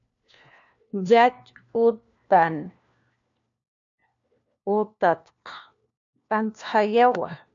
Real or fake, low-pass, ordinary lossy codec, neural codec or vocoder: fake; 7.2 kHz; MP3, 64 kbps; codec, 16 kHz, 1 kbps, FunCodec, trained on LibriTTS, 50 frames a second